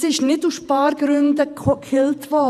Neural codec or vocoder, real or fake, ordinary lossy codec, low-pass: vocoder, 48 kHz, 128 mel bands, Vocos; fake; none; 14.4 kHz